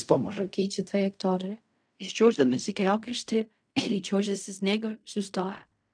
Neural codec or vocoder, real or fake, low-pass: codec, 16 kHz in and 24 kHz out, 0.4 kbps, LongCat-Audio-Codec, fine tuned four codebook decoder; fake; 9.9 kHz